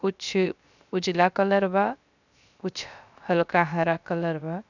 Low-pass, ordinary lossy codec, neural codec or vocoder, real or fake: 7.2 kHz; none; codec, 16 kHz, 0.3 kbps, FocalCodec; fake